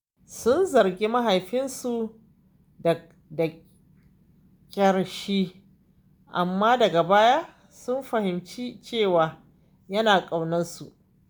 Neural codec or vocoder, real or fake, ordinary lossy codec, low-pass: none; real; none; none